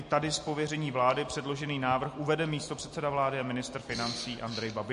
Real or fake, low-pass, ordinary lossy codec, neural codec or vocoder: real; 10.8 kHz; MP3, 48 kbps; none